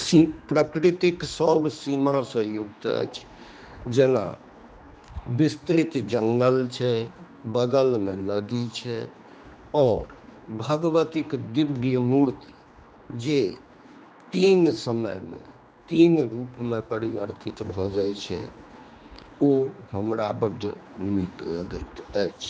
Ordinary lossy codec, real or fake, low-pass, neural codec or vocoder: none; fake; none; codec, 16 kHz, 2 kbps, X-Codec, HuBERT features, trained on general audio